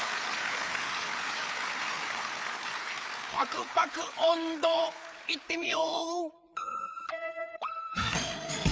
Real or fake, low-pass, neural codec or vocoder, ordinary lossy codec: fake; none; codec, 16 kHz, 4 kbps, FreqCodec, larger model; none